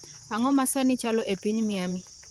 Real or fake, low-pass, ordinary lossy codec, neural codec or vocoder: fake; 19.8 kHz; Opus, 24 kbps; vocoder, 44.1 kHz, 128 mel bands, Pupu-Vocoder